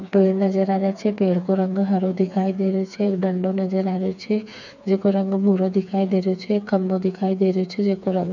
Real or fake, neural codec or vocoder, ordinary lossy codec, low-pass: fake; codec, 16 kHz, 4 kbps, FreqCodec, smaller model; none; 7.2 kHz